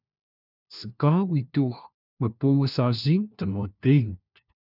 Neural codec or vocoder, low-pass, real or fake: codec, 16 kHz, 1 kbps, FunCodec, trained on LibriTTS, 50 frames a second; 5.4 kHz; fake